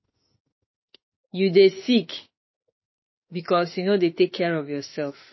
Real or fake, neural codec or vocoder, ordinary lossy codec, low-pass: fake; autoencoder, 48 kHz, 32 numbers a frame, DAC-VAE, trained on Japanese speech; MP3, 24 kbps; 7.2 kHz